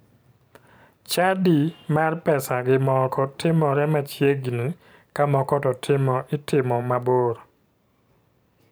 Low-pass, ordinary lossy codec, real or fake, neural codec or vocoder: none; none; fake; vocoder, 44.1 kHz, 128 mel bands every 512 samples, BigVGAN v2